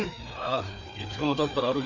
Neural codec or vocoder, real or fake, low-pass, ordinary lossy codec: codec, 16 kHz, 4 kbps, FreqCodec, larger model; fake; 7.2 kHz; none